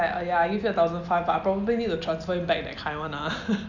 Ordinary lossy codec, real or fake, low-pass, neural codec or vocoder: none; real; 7.2 kHz; none